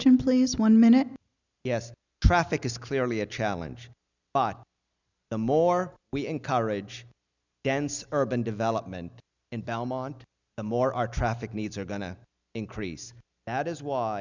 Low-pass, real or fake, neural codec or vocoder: 7.2 kHz; real; none